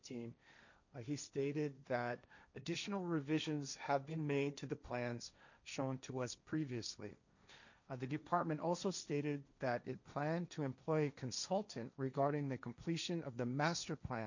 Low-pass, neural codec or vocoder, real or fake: 7.2 kHz; codec, 16 kHz, 1.1 kbps, Voila-Tokenizer; fake